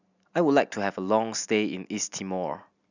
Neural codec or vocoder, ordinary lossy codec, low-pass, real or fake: none; none; 7.2 kHz; real